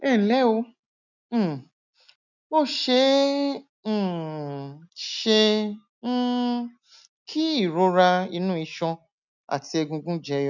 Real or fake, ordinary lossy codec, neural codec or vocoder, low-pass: real; none; none; 7.2 kHz